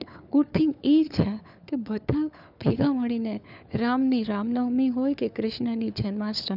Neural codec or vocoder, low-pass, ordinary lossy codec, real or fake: codec, 16 kHz, 4 kbps, FunCodec, trained on LibriTTS, 50 frames a second; 5.4 kHz; none; fake